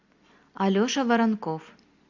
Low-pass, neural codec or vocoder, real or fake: 7.2 kHz; none; real